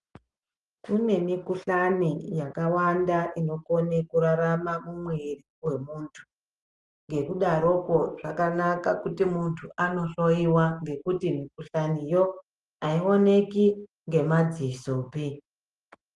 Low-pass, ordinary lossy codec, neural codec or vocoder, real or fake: 10.8 kHz; Opus, 24 kbps; none; real